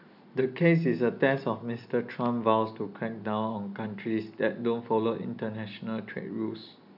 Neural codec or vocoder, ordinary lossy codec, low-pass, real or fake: none; none; 5.4 kHz; real